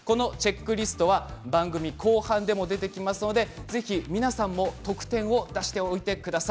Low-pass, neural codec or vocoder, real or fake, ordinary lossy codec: none; none; real; none